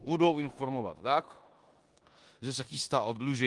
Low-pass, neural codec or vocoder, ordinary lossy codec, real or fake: 10.8 kHz; codec, 16 kHz in and 24 kHz out, 0.9 kbps, LongCat-Audio-Codec, four codebook decoder; Opus, 24 kbps; fake